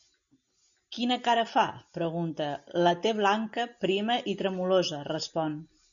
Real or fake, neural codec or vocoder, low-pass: real; none; 7.2 kHz